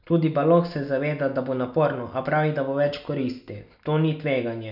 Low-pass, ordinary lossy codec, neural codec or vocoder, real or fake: 5.4 kHz; none; none; real